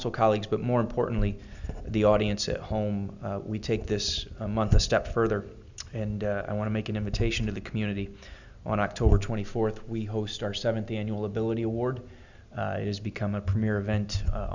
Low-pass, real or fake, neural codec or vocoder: 7.2 kHz; real; none